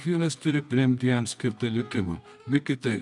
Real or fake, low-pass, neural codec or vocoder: fake; 10.8 kHz; codec, 24 kHz, 0.9 kbps, WavTokenizer, medium music audio release